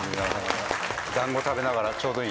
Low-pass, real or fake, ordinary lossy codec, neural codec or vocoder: none; real; none; none